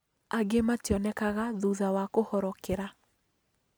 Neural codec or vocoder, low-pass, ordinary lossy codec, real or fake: none; none; none; real